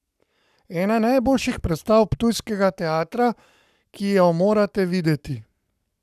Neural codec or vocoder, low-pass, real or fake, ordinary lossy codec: codec, 44.1 kHz, 7.8 kbps, Pupu-Codec; 14.4 kHz; fake; none